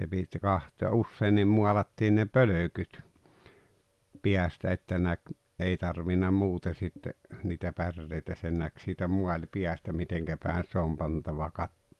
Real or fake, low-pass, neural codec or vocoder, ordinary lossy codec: real; 14.4 kHz; none; Opus, 32 kbps